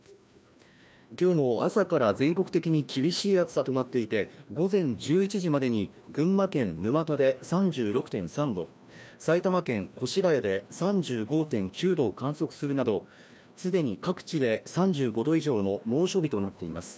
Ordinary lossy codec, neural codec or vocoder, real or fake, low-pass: none; codec, 16 kHz, 1 kbps, FreqCodec, larger model; fake; none